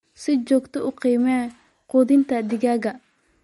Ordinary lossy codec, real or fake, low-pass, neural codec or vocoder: MP3, 48 kbps; real; 19.8 kHz; none